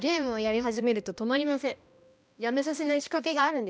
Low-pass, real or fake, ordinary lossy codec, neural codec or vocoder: none; fake; none; codec, 16 kHz, 1 kbps, X-Codec, HuBERT features, trained on balanced general audio